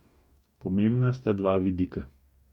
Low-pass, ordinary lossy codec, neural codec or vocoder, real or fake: 19.8 kHz; Opus, 64 kbps; codec, 44.1 kHz, 2.6 kbps, DAC; fake